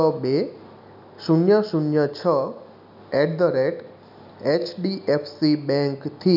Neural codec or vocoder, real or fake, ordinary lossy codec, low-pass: none; real; none; 5.4 kHz